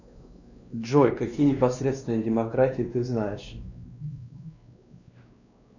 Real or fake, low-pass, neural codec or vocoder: fake; 7.2 kHz; codec, 16 kHz, 2 kbps, X-Codec, WavLM features, trained on Multilingual LibriSpeech